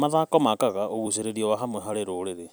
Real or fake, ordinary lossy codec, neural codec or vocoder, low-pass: fake; none; vocoder, 44.1 kHz, 128 mel bands every 256 samples, BigVGAN v2; none